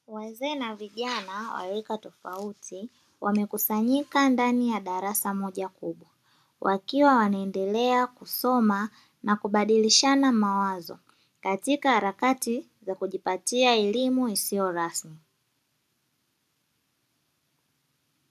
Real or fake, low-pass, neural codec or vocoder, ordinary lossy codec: real; 14.4 kHz; none; AAC, 96 kbps